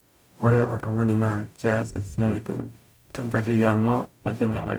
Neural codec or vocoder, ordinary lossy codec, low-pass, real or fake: codec, 44.1 kHz, 0.9 kbps, DAC; none; none; fake